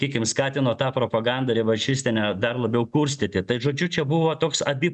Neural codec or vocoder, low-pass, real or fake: none; 10.8 kHz; real